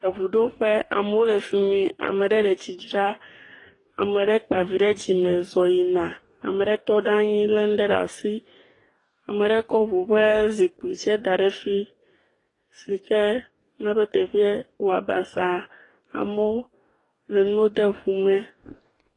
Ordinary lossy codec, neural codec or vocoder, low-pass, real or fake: AAC, 32 kbps; codec, 44.1 kHz, 2.6 kbps, DAC; 10.8 kHz; fake